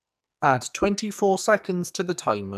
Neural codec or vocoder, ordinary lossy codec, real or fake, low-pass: codec, 44.1 kHz, 2.6 kbps, SNAC; none; fake; 14.4 kHz